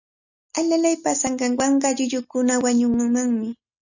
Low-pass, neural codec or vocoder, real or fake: 7.2 kHz; none; real